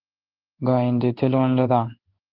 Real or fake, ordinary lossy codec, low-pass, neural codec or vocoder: fake; Opus, 16 kbps; 5.4 kHz; codec, 16 kHz in and 24 kHz out, 1 kbps, XY-Tokenizer